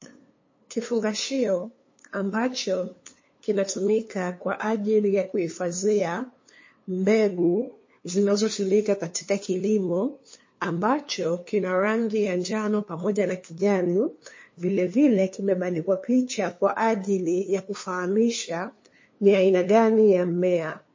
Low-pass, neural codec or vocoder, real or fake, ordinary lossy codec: 7.2 kHz; codec, 16 kHz, 2 kbps, FunCodec, trained on LibriTTS, 25 frames a second; fake; MP3, 32 kbps